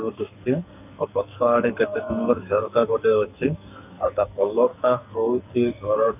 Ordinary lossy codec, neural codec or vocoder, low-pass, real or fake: none; codec, 44.1 kHz, 2.6 kbps, SNAC; 3.6 kHz; fake